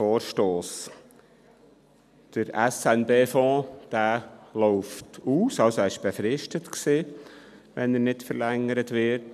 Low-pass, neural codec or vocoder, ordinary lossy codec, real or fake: 14.4 kHz; none; none; real